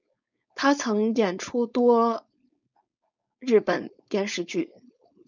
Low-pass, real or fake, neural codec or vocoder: 7.2 kHz; fake; codec, 16 kHz, 4.8 kbps, FACodec